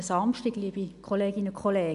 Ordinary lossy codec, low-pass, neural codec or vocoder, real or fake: none; 10.8 kHz; none; real